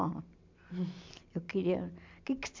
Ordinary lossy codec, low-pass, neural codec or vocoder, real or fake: MP3, 64 kbps; 7.2 kHz; none; real